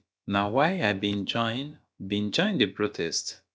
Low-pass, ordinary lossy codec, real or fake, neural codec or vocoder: none; none; fake; codec, 16 kHz, about 1 kbps, DyCAST, with the encoder's durations